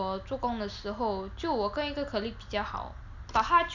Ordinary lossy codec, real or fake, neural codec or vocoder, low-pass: none; real; none; 7.2 kHz